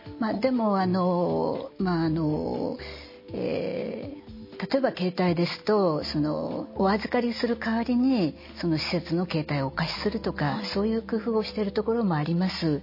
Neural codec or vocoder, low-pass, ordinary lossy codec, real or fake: none; 5.4 kHz; MP3, 24 kbps; real